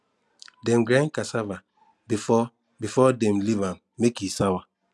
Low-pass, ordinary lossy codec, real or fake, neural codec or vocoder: none; none; real; none